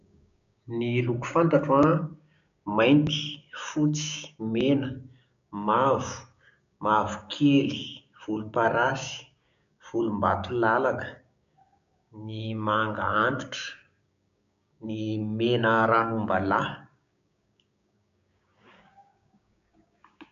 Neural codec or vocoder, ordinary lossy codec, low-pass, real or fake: codec, 16 kHz, 6 kbps, DAC; MP3, 64 kbps; 7.2 kHz; fake